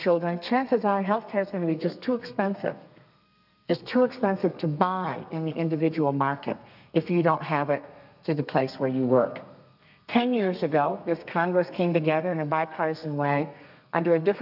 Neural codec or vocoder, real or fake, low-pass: codec, 32 kHz, 1.9 kbps, SNAC; fake; 5.4 kHz